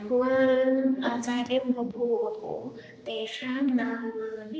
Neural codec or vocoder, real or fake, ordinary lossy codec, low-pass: codec, 16 kHz, 1 kbps, X-Codec, HuBERT features, trained on general audio; fake; none; none